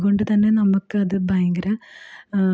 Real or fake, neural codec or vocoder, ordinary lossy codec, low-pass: real; none; none; none